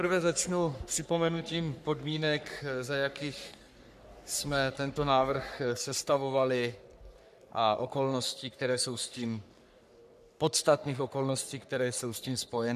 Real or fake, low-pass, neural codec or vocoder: fake; 14.4 kHz; codec, 44.1 kHz, 3.4 kbps, Pupu-Codec